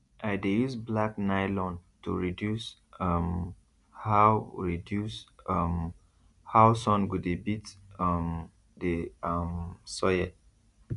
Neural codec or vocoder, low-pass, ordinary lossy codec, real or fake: none; 10.8 kHz; none; real